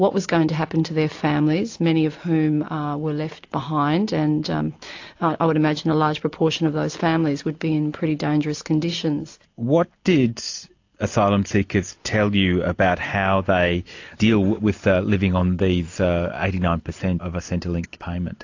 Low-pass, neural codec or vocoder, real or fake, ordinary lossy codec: 7.2 kHz; none; real; AAC, 48 kbps